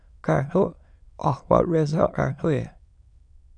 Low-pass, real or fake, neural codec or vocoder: 9.9 kHz; fake; autoencoder, 22.05 kHz, a latent of 192 numbers a frame, VITS, trained on many speakers